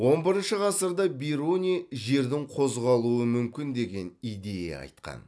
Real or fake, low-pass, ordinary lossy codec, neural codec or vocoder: real; none; none; none